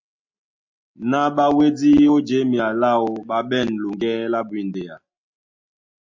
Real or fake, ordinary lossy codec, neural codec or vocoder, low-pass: real; MP3, 48 kbps; none; 7.2 kHz